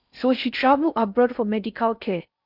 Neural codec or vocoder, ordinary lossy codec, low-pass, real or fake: codec, 16 kHz in and 24 kHz out, 0.6 kbps, FocalCodec, streaming, 4096 codes; none; 5.4 kHz; fake